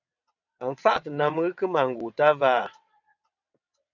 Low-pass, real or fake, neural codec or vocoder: 7.2 kHz; fake; vocoder, 22.05 kHz, 80 mel bands, WaveNeXt